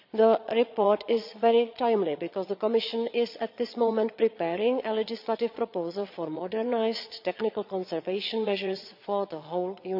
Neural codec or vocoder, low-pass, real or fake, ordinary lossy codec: vocoder, 22.05 kHz, 80 mel bands, Vocos; 5.4 kHz; fake; none